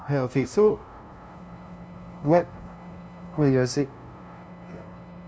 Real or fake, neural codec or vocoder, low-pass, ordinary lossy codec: fake; codec, 16 kHz, 0.5 kbps, FunCodec, trained on LibriTTS, 25 frames a second; none; none